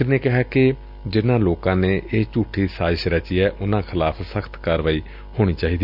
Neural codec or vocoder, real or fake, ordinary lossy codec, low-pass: none; real; none; 5.4 kHz